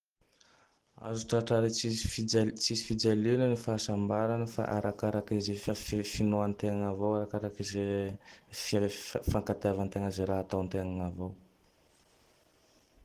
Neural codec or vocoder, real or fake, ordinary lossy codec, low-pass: none; real; Opus, 16 kbps; 14.4 kHz